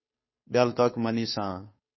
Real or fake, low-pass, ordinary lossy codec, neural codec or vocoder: fake; 7.2 kHz; MP3, 24 kbps; codec, 16 kHz, 2 kbps, FunCodec, trained on Chinese and English, 25 frames a second